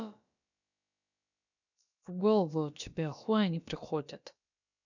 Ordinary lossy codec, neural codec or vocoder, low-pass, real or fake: none; codec, 16 kHz, about 1 kbps, DyCAST, with the encoder's durations; 7.2 kHz; fake